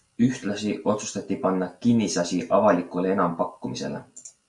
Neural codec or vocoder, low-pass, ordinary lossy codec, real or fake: none; 10.8 kHz; Opus, 64 kbps; real